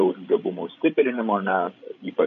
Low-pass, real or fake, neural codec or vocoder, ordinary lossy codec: 7.2 kHz; fake; codec, 16 kHz, 16 kbps, FreqCodec, larger model; AAC, 96 kbps